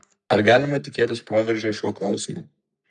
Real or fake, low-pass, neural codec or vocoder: fake; 10.8 kHz; codec, 44.1 kHz, 3.4 kbps, Pupu-Codec